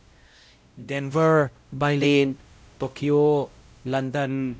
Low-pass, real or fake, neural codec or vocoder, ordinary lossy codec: none; fake; codec, 16 kHz, 0.5 kbps, X-Codec, WavLM features, trained on Multilingual LibriSpeech; none